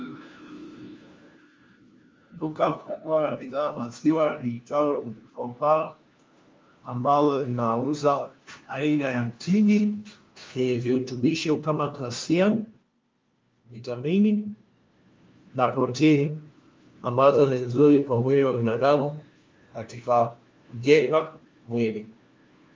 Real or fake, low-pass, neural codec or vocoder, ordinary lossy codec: fake; 7.2 kHz; codec, 16 kHz, 1 kbps, FunCodec, trained on LibriTTS, 50 frames a second; Opus, 32 kbps